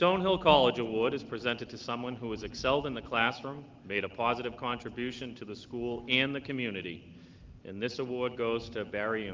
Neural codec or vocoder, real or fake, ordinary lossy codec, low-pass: none; real; Opus, 32 kbps; 7.2 kHz